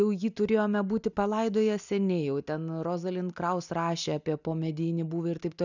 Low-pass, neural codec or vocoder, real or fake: 7.2 kHz; none; real